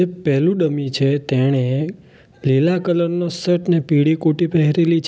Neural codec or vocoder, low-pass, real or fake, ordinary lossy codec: none; none; real; none